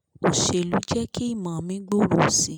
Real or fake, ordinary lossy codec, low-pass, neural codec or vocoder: real; none; none; none